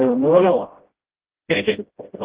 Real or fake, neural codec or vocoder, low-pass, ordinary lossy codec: fake; codec, 16 kHz, 0.5 kbps, FreqCodec, smaller model; 3.6 kHz; Opus, 16 kbps